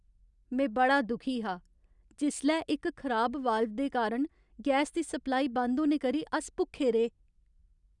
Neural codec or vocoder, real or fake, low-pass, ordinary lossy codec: none; real; 10.8 kHz; none